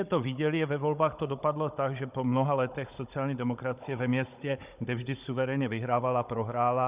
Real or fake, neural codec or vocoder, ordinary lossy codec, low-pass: fake; codec, 16 kHz, 16 kbps, FunCodec, trained on Chinese and English, 50 frames a second; Opus, 64 kbps; 3.6 kHz